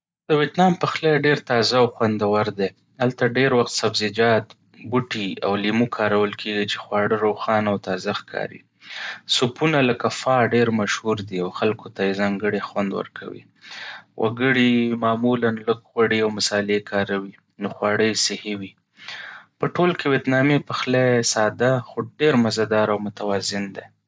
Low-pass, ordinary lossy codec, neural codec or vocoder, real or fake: none; none; none; real